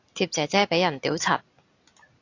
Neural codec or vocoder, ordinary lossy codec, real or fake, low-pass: none; AAC, 48 kbps; real; 7.2 kHz